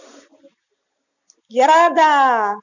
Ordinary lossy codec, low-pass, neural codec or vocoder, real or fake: none; 7.2 kHz; none; real